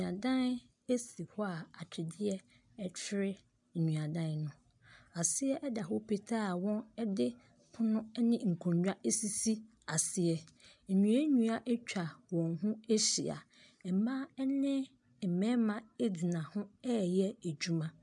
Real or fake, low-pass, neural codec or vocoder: real; 10.8 kHz; none